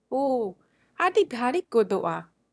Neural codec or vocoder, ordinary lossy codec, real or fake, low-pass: autoencoder, 22.05 kHz, a latent of 192 numbers a frame, VITS, trained on one speaker; none; fake; none